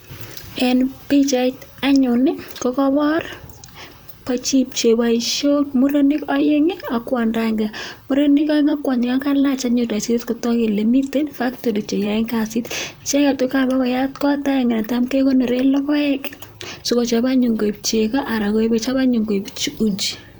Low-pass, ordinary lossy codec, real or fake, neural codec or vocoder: none; none; fake; vocoder, 44.1 kHz, 128 mel bands, Pupu-Vocoder